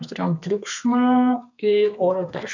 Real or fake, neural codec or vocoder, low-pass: fake; codec, 32 kHz, 1.9 kbps, SNAC; 7.2 kHz